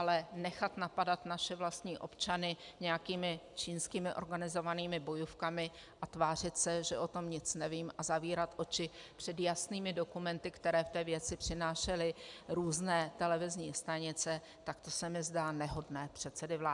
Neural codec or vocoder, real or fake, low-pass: none; real; 10.8 kHz